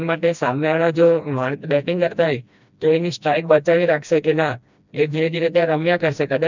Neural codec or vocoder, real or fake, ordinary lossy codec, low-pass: codec, 16 kHz, 1 kbps, FreqCodec, smaller model; fake; none; 7.2 kHz